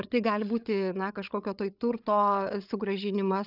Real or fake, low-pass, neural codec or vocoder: fake; 5.4 kHz; codec, 16 kHz, 8 kbps, FreqCodec, larger model